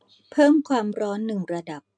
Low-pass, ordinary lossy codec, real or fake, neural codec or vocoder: 9.9 kHz; MP3, 64 kbps; real; none